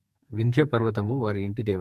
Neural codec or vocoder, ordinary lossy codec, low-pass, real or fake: codec, 44.1 kHz, 2.6 kbps, SNAC; MP3, 64 kbps; 14.4 kHz; fake